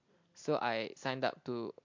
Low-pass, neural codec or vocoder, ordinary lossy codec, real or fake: 7.2 kHz; none; MP3, 48 kbps; real